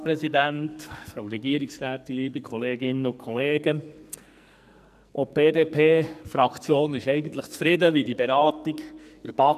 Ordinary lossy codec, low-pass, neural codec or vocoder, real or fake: none; 14.4 kHz; codec, 44.1 kHz, 2.6 kbps, SNAC; fake